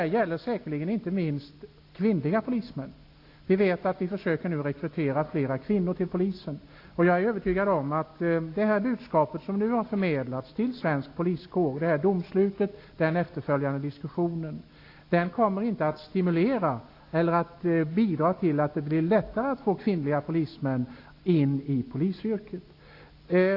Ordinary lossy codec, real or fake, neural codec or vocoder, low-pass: AAC, 32 kbps; real; none; 5.4 kHz